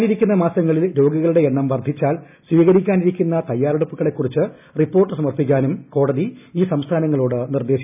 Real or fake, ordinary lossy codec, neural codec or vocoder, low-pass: real; none; none; 3.6 kHz